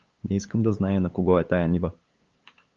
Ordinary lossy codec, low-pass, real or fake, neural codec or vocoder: Opus, 32 kbps; 7.2 kHz; fake; codec, 16 kHz, 8 kbps, FunCodec, trained on LibriTTS, 25 frames a second